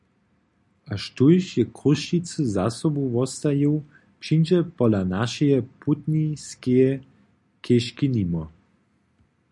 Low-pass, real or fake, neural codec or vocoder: 10.8 kHz; real; none